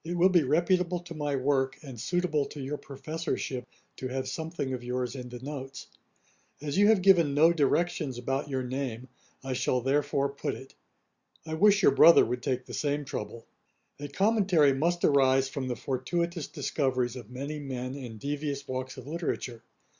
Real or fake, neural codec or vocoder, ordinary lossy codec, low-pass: real; none; Opus, 64 kbps; 7.2 kHz